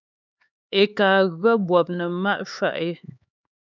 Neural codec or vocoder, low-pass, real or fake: codec, 16 kHz, 4 kbps, X-Codec, HuBERT features, trained on LibriSpeech; 7.2 kHz; fake